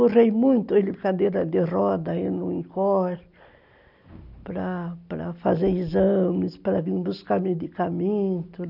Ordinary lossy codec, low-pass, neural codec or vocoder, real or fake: none; 5.4 kHz; none; real